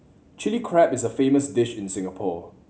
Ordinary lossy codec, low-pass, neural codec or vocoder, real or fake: none; none; none; real